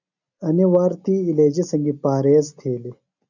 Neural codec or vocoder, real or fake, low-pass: none; real; 7.2 kHz